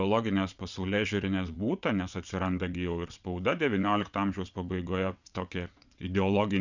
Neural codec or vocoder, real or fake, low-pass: vocoder, 22.05 kHz, 80 mel bands, WaveNeXt; fake; 7.2 kHz